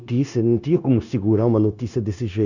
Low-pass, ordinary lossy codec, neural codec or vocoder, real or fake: 7.2 kHz; none; codec, 16 kHz, 0.9 kbps, LongCat-Audio-Codec; fake